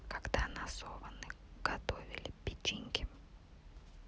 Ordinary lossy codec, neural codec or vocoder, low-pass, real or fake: none; none; none; real